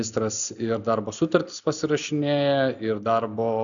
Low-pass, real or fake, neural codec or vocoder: 7.2 kHz; real; none